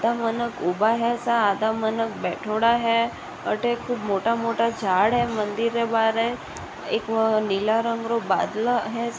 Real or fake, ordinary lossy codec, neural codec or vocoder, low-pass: real; none; none; none